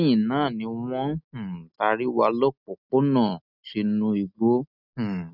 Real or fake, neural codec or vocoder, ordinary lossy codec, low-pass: real; none; none; 5.4 kHz